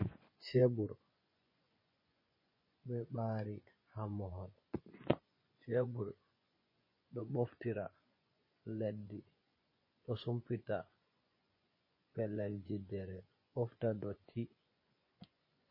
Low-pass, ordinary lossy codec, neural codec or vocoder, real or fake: 5.4 kHz; MP3, 24 kbps; vocoder, 24 kHz, 100 mel bands, Vocos; fake